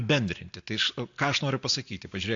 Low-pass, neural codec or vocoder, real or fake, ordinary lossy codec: 7.2 kHz; none; real; AAC, 48 kbps